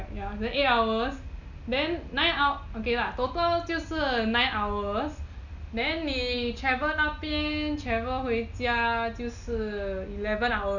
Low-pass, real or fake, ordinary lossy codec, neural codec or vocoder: 7.2 kHz; real; none; none